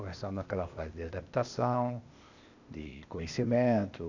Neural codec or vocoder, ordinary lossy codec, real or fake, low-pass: codec, 16 kHz, 0.8 kbps, ZipCodec; none; fake; 7.2 kHz